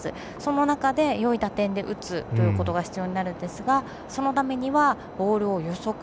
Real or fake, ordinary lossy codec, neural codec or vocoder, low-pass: real; none; none; none